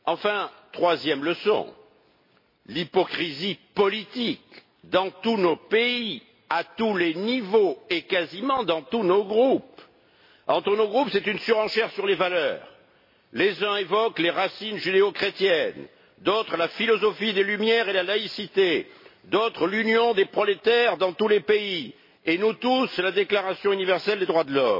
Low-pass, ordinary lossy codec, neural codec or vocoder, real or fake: 5.4 kHz; MP3, 24 kbps; none; real